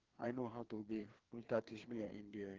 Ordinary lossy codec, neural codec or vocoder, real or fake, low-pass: Opus, 16 kbps; codec, 16 kHz, 2 kbps, FreqCodec, larger model; fake; 7.2 kHz